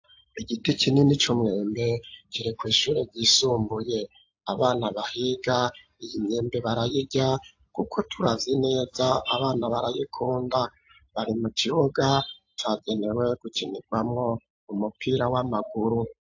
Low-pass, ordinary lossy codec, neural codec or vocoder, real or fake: 7.2 kHz; AAC, 48 kbps; none; real